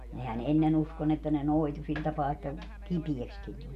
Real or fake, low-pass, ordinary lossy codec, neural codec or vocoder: real; 14.4 kHz; MP3, 64 kbps; none